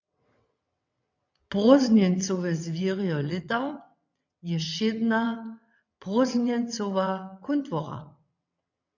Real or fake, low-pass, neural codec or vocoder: fake; 7.2 kHz; vocoder, 22.05 kHz, 80 mel bands, WaveNeXt